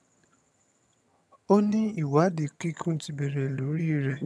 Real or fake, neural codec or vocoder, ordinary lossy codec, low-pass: fake; vocoder, 22.05 kHz, 80 mel bands, HiFi-GAN; none; none